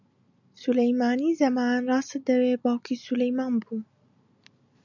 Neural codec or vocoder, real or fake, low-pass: none; real; 7.2 kHz